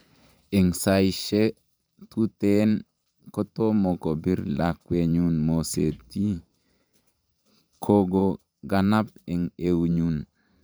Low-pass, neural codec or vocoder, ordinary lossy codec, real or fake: none; vocoder, 44.1 kHz, 128 mel bands every 512 samples, BigVGAN v2; none; fake